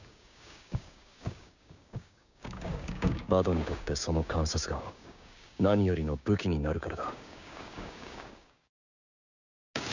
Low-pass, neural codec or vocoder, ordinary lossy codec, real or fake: 7.2 kHz; codec, 16 kHz, 6 kbps, DAC; none; fake